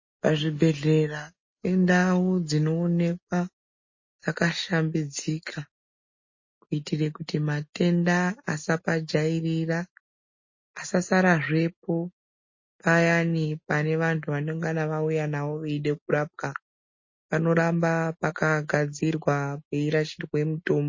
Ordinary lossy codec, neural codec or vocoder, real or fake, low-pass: MP3, 32 kbps; none; real; 7.2 kHz